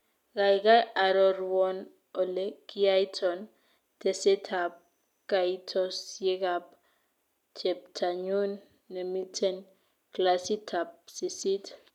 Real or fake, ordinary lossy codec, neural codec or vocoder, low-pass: real; none; none; 19.8 kHz